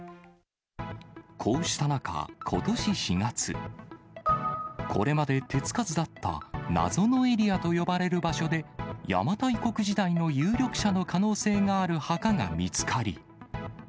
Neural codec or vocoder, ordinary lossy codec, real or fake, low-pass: none; none; real; none